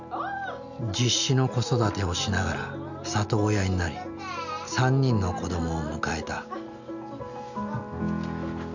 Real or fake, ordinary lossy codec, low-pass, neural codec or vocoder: real; none; 7.2 kHz; none